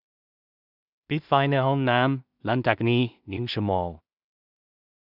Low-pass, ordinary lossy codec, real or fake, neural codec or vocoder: 5.4 kHz; none; fake; codec, 16 kHz in and 24 kHz out, 0.4 kbps, LongCat-Audio-Codec, two codebook decoder